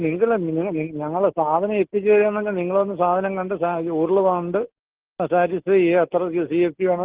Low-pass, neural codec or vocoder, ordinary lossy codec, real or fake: 3.6 kHz; none; Opus, 16 kbps; real